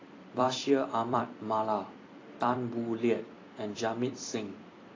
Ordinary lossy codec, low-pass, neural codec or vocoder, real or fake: AAC, 32 kbps; 7.2 kHz; vocoder, 44.1 kHz, 128 mel bands every 256 samples, BigVGAN v2; fake